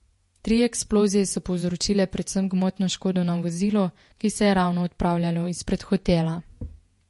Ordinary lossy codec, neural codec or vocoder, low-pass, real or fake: MP3, 48 kbps; vocoder, 48 kHz, 128 mel bands, Vocos; 14.4 kHz; fake